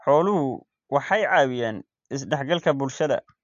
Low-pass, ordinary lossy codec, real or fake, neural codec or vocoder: 7.2 kHz; AAC, 64 kbps; real; none